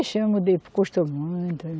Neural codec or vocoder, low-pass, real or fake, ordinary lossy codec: none; none; real; none